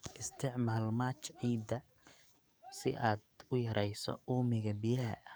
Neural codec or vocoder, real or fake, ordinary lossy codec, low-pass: codec, 44.1 kHz, 7.8 kbps, DAC; fake; none; none